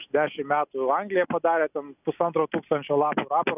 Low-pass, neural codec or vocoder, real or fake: 3.6 kHz; none; real